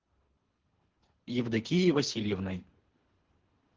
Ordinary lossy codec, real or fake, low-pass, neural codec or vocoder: Opus, 16 kbps; fake; 7.2 kHz; codec, 24 kHz, 3 kbps, HILCodec